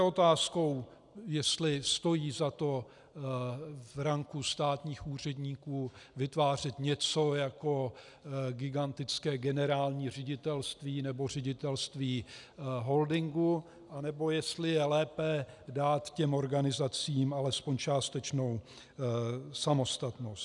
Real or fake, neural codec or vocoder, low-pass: real; none; 10.8 kHz